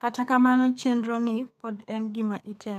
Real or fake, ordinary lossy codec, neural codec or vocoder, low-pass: fake; none; codec, 32 kHz, 1.9 kbps, SNAC; 14.4 kHz